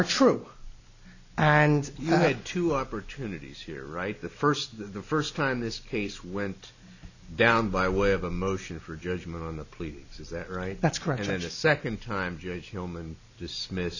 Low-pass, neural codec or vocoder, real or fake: 7.2 kHz; none; real